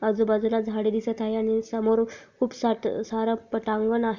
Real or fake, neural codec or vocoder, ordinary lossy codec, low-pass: real; none; none; 7.2 kHz